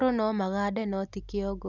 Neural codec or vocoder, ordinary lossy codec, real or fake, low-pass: none; none; real; 7.2 kHz